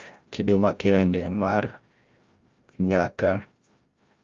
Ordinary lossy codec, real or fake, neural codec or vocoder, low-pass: Opus, 32 kbps; fake; codec, 16 kHz, 0.5 kbps, FreqCodec, larger model; 7.2 kHz